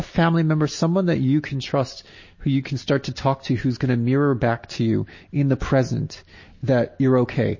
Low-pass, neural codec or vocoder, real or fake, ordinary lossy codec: 7.2 kHz; none; real; MP3, 32 kbps